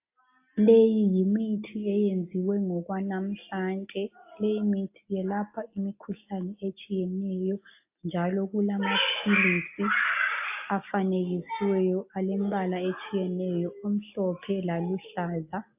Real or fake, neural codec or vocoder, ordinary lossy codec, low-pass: real; none; AAC, 32 kbps; 3.6 kHz